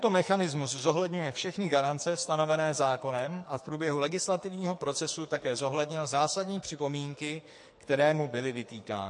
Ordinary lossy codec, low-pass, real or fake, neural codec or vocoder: MP3, 48 kbps; 10.8 kHz; fake; codec, 44.1 kHz, 2.6 kbps, SNAC